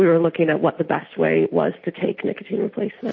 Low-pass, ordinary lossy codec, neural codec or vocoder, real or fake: 7.2 kHz; MP3, 32 kbps; none; real